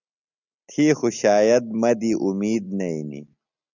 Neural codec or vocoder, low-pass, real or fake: none; 7.2 kHz; real